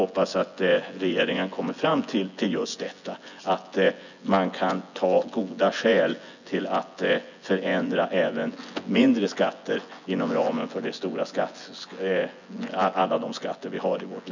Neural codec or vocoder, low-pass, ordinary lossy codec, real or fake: vocoder, 24 kHz, 100 mel bands, Vocos; 7.2 kHz; none; fake